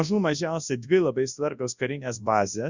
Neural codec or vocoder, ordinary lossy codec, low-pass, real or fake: codec, 24 kHz, 0.9 kbps, WavTokenizer, large speech release; Opus, 64 kbps; 7.2 kHz; fake